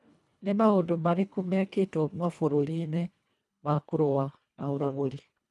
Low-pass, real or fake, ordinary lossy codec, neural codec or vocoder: none; fake; none; codec, 24 kHz, 1.5 kbps, HILCodec